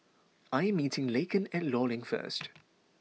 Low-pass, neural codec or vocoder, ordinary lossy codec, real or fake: none; none; none; real